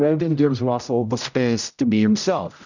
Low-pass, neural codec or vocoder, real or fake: 7.2 kHz; codec, 16 kHz, 0.5 kbps, X-Codec, HuBERT features, trained on general audio; fake